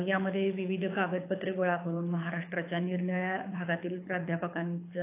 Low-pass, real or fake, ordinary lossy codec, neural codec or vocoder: 3.6 kHz; fake; AAC, 24 kbps; codec, 24 kHz, 6 kbps, HILCodec